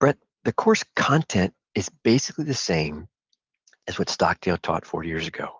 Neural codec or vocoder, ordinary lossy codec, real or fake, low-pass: none; Opus, 32 kbps; real; 7.2 kHz